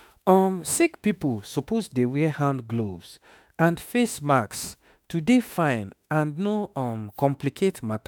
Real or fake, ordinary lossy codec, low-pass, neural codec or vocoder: fake; none; none; autoencoder, 48 kHz, 32 numbers a frame, DAC-VAE, trained on Japanese speech